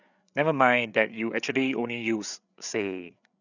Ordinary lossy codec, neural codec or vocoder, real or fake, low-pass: none; codec, 16 kHz, 8 kbps, FreqCodec, larger model; fake; 7.2 kHz